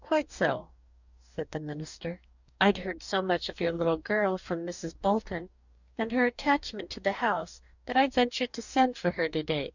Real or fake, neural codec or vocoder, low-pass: fake; codec, 44.1 kHz, 2.6 kbps, DAC; 7.2 kHz